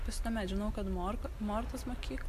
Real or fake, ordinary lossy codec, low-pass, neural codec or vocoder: real; AAC, 64 kbps; 14.4 kHz; none